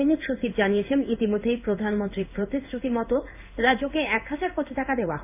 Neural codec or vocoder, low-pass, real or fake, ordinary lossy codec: codec, 16 kHz in and 24 kHz out, 1 kbps, XY-Tokenizer; 3.6 kHz; fake; none